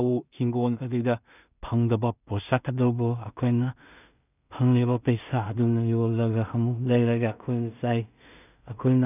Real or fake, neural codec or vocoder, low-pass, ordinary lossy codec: fake; codec, 16 kHz in and 24 kHz out, 0.4 kbps, LongCat-Audio-Codec, two codebook decoder; 3.6 kHz; none